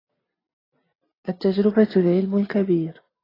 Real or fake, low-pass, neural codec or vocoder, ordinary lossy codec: real; 5.4 kHz; none; AAC, 24 kbps